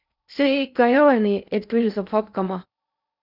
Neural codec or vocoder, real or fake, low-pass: codec, 16 kHz in and 24 kHz out, 0.6 kbps, FocalCodec, streaming, 2048 codes; fake; 5.4 kHz